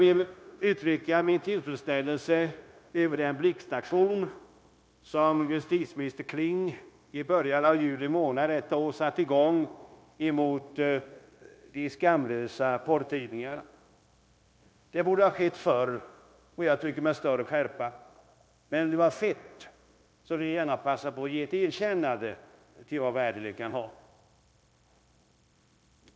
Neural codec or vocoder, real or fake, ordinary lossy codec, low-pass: codec, 16 kHz, 0.9 kbps, LongCat-Audio-Codec; fake; none; none